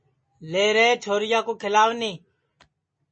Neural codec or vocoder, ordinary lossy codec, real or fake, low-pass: none; MP3, 32 kbps; real; 9.9 kHz